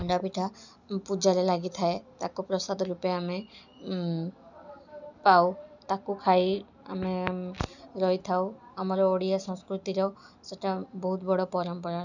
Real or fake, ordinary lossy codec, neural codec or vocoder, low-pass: real; none; none; 7.2 kHz